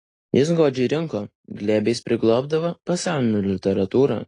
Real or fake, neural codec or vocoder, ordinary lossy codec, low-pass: real; none; AAC, 32 kbps; 10.8 kHz